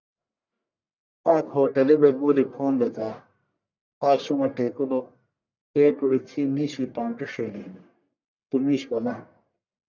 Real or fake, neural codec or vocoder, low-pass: fake; codec, 44.1 kHz, 1.7 kbps, Pupu-Codec; 7.2 kHz